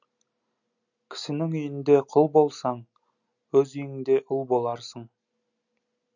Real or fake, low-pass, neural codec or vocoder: real; 7.2 kHz; none